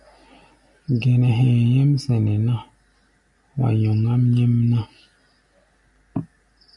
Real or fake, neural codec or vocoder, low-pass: real; none; 10.8 kHz